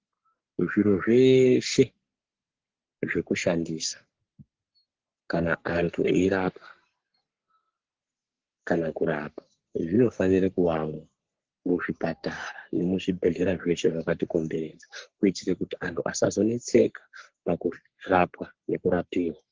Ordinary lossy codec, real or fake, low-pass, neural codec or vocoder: Opus, 16 kbps; fake; 7.2 kHz; codec, 44.1 kHz, 3.4 kbps, Pupu-Codec